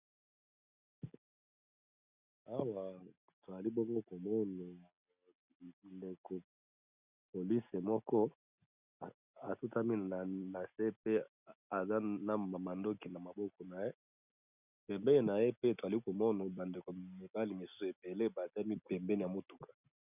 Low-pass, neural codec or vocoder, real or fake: 3.6 kHz; none; real